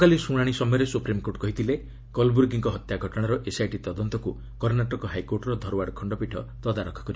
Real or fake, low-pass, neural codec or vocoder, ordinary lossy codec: real; none; none; none